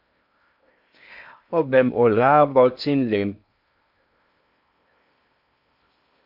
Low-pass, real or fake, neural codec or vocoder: 5.4 kHz; fake; codec, 16 kHz in and 24 kHz out, 0.6 kbps, FocalCodec, streaming, 2048 codes